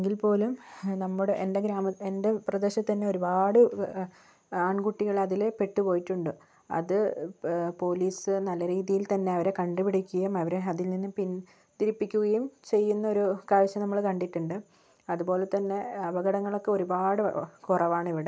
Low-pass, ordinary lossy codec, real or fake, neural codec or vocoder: none; none; real; none